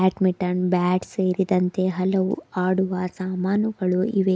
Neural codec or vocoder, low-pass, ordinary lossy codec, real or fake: none; none; none; real